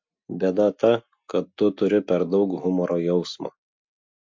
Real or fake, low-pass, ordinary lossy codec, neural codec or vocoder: real; 7.2 kHz; MP3, 48 kbps; none